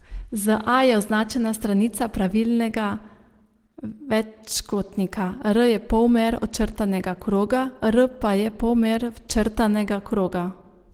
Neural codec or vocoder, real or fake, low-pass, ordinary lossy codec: none; real; 19.8 kHz; Opus, 16 kbps